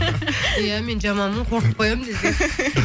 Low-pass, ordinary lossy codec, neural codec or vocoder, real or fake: none; none; none; real